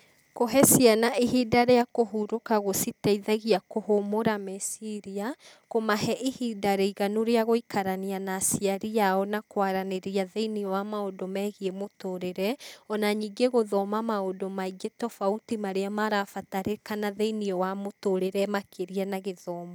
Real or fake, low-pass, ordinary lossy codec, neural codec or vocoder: real; none; none; none